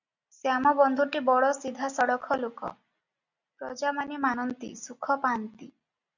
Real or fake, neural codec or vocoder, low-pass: real; none; 7.2 kHz